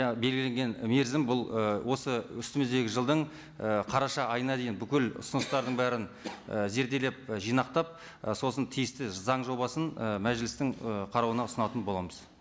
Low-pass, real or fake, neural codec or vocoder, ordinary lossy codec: none; real; none; none